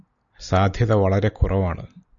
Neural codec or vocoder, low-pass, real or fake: none; 7.2 kHz; real